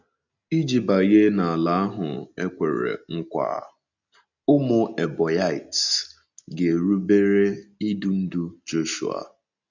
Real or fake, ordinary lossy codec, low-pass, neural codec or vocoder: real; none; 7.2 kHz; none